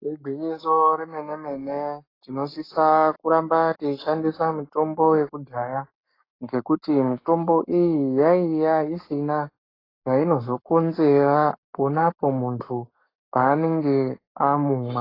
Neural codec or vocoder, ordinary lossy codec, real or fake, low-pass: codec, 44.1 kHz, 7.8 kbps, Pupu-Codec; AAC, 24 kbps; fake; 5.4 kHz